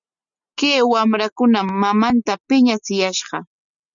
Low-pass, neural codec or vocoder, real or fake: 7.2 kHz; none; real